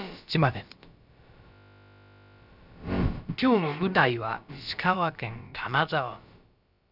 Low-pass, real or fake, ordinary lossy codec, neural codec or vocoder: 5.4 kHz; fake; none; codec, 16 kHz, about 1 kbps, DyCAST, with the encoder's durations